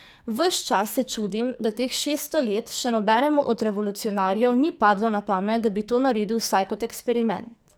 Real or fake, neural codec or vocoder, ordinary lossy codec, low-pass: fake; codec, 44.1 kHz, 2.6 kbps, SNAC; none; none